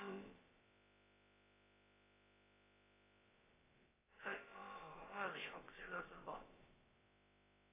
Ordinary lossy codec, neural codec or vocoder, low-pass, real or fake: AAC, 16 kbps; codec, 16 kHz, about 1 kbps, DyCAST, with the encoder's durations; 3.6 kHz; fake